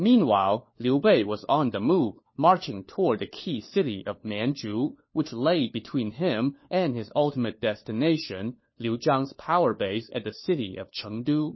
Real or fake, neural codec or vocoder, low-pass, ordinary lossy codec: fake; codec, 16 kHz, 4 kbps, FunCodec, trained on LibriTTS, 50 frames a second; 7.2 kHz; MP3, 24 kbps